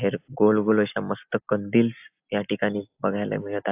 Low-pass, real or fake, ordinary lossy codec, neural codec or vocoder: 3.6 kHz; real; AAC, 32 kbps; none